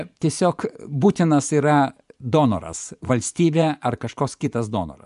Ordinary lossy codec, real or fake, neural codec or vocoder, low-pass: MP3, 96 kbps; fake; vocoder, 24 kHz, 100 mel bands, Vocos; 10.8 kHz